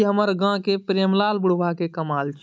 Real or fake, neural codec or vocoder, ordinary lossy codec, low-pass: real; none; none; none